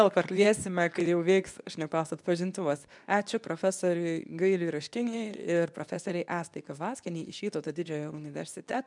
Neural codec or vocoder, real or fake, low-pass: codec, 24 kHz, 0.9 kbps, WavTokenizer, medium speech release version 2; fake; 10.8 kHz